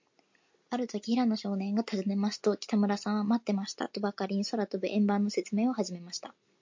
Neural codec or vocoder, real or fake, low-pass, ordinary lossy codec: none; real; 7.2 kHz; MP3, 48 kbps